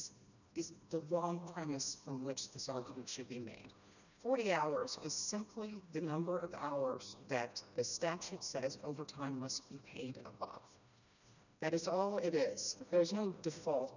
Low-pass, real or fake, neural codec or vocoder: 7.2 kHz; fake; codec, 16 kHz, 1 kbps, FreqCodec, smaller model